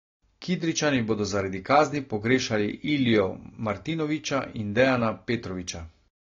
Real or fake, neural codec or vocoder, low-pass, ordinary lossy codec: real; none; 7.2 kHz; AAC, 32 kbps